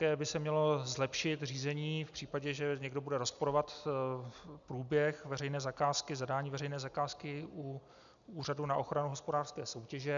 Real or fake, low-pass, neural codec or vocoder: real; 7.2 kHz; none